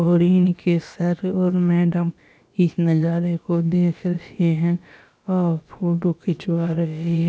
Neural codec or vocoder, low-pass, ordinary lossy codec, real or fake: codec, 16 kHz, about 1 kbps, DyCAST, with the encoder's durations; none; none; fake